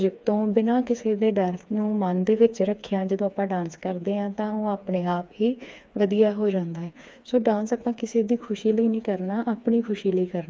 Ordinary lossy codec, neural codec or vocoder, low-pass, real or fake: none; codec, 16 kHz, 4 kbps, FreqCodec, smaller model; none; fake